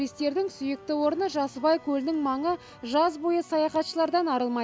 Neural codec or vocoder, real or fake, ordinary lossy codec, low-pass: none; real; none; none